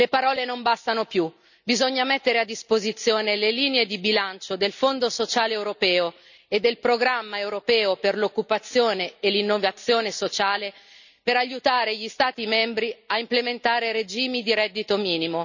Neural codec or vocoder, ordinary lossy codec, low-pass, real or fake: none; none; 7.2 kHz; real